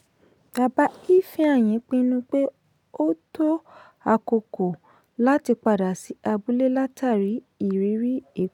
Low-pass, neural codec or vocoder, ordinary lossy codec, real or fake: 19.8 kHz; none; none; real